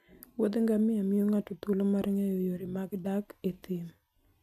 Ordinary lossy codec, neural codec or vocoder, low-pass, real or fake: none; none; 14.4 kHz; real